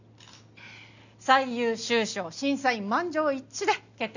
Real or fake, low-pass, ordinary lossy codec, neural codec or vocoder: real; 7.2 kHz; none; none